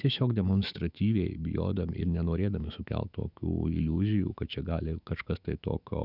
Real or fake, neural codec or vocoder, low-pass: fake; autoencoder, 48 kHz, 128 numbers a frame, DAC-VAE, trained on Japanese speech; 5.4 kHz